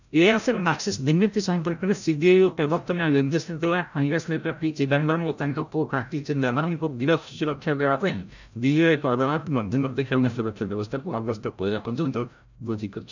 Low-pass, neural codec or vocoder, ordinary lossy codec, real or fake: 7.2 kHz; codec, 16 kHz, 0.5 kbps, FreqCodec, larger model; none; fake